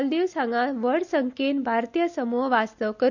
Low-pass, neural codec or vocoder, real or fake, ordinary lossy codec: 7.2 kHz; none; real; none